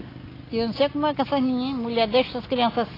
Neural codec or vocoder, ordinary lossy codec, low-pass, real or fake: none; AAC, 24 kbps; 5.4 kHz; real